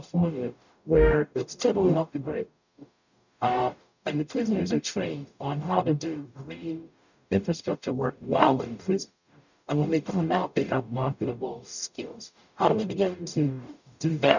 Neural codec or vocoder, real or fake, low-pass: codec, 44.1 kHz, 0.9 kbps, DAC; fake; 7.2 kHz